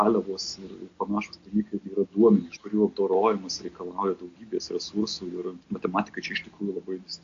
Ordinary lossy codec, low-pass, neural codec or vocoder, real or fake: AAC, 48 kbps; 7.2 kHz; none; real